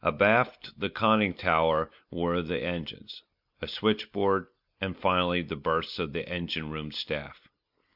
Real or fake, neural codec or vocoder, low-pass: real; none; 5.4 kHz